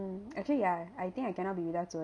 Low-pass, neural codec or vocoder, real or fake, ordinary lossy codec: 9.9 kHz; none; real; MP3, 96 kbps